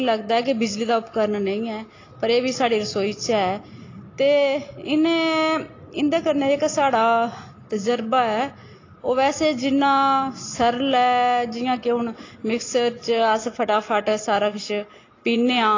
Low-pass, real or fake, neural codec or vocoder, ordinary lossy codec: 7.2 kHz; real; none; AAC, 32 kbps